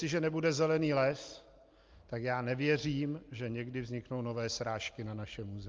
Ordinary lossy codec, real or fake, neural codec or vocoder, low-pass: Opus, 24 kbps; real; none; 7.2 kHz